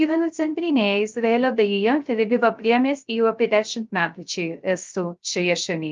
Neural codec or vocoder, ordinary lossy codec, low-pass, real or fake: codec, 16 kHz, 0.3 kbps, FocalCodec; Opus, 32 kbps; 7.2 kHz; fake